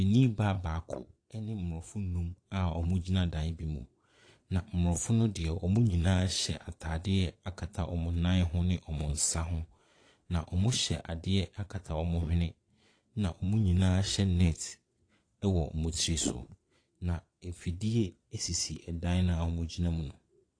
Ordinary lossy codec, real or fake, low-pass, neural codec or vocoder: AAC, 48 kbps; fake; 9.9 kHz; vocoder, 22.05 kHz, 80 mel bands, Vocos